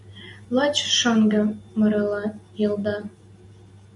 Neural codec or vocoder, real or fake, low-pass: none; real; 10.8 kHz